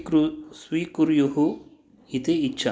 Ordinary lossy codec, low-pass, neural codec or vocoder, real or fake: none; none; none; real